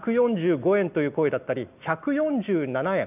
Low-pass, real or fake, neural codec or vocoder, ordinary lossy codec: 3.6 kHz; real; none; none